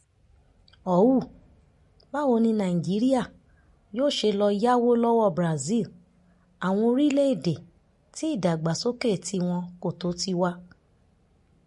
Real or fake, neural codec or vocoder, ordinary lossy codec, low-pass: real; none; MP3, 48 kbps; 14.4 kHz